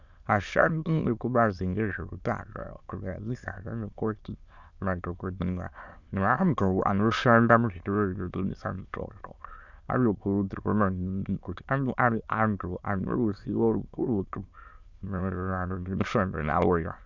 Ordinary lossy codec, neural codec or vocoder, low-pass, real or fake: Opus, 64 kbps; autoencoder, 22.05 kHz, a latent of 192 numbers a frame, VITS, trained on many speakers; 7.2 kHz; fake